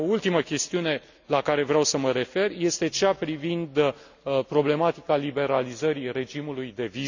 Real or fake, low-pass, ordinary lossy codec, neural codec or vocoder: real; 7.2 kHz; none; none